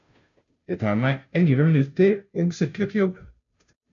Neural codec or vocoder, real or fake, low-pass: codec, 16 kHz, 0.5 kbps, FunCodec, trained on Chinese and English, 25 frames a second; fake; 7.2 kHz